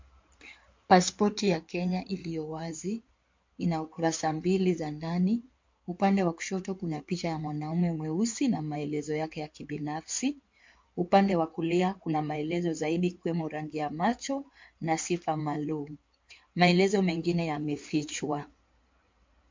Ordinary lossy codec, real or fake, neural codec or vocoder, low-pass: MP3, 48 kbps; fake; codec, 16 kHz in and 24 kHz out, 2.2 kbps, FireRedTTS-2 codec; 7.2 kHz